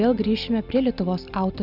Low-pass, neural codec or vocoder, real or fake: 5.4 kHz; none; real